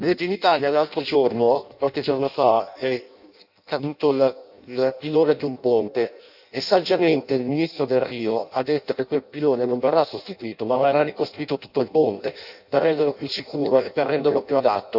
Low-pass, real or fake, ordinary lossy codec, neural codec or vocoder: 5.4 kHz; fake; none; codec, 16 kHz in and 24 kHz out, 0.6 kbps, FireRedTTS-2 codec